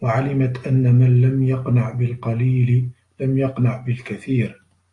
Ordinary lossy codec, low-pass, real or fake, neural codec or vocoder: AAC, 48 kbps; 10.8 kHz; real; none